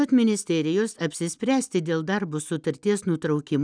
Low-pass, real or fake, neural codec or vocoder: 9.9 kHz; real; none